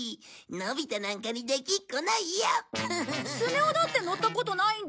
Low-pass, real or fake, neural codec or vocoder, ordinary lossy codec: none; real; none; none